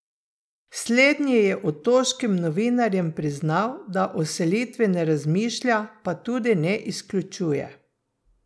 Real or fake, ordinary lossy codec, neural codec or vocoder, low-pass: real; none; none; none